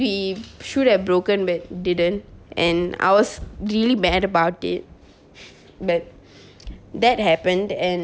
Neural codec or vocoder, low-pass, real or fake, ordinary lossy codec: none; none; real; none